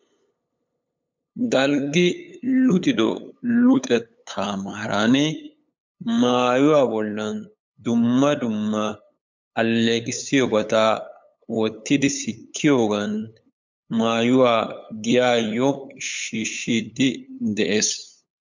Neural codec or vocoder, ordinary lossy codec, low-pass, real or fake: codec, 16 kHz, 8 kbps, FunCodec, trained on LibriTTS, 25 frames a second; MP3, 64 kbps; 7.2 kHz; fake